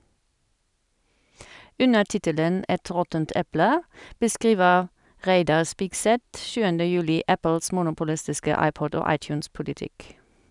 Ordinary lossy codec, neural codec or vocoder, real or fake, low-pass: none; none; real; 10.8 kHz